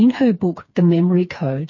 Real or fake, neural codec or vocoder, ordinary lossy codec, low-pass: fake; codec, 16 kHz, 4 kbps, FreqCodec, smaller model; MP3, 32 kbps; 7.2 kHz